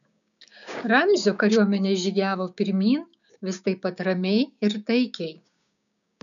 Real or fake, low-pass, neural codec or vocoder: fake; 7.2 kHz; codec, 16 kHz, 6 kbps, DAC